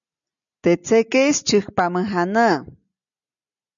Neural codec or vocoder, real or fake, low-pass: none; real; 7.2 kHz